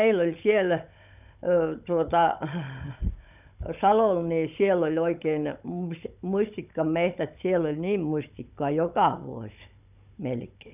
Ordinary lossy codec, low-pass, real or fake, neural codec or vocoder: none; 3.6 kHz; fake; codec, 16 kHz, 16 kbps, FunCodec, trained on Chinese and English, 50 frames a second